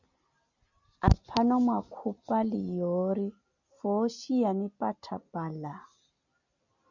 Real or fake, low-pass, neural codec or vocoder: real; 7.2 kHz; none